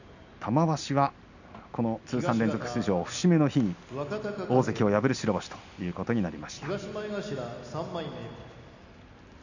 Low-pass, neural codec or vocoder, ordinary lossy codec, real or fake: 7.2 kHz; none; none; real